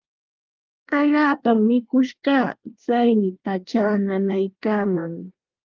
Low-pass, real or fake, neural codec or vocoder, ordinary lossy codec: 7.2 kHz; fake; codec, 24 kHz, 1 kbps, SNAC; Opus, 32 kbps